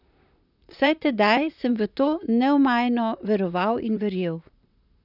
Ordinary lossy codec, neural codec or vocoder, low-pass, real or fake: none; vocoder, 44.1 kHz, 128 mel bands, Pupu-Vocoder; 5.4 kHz; fake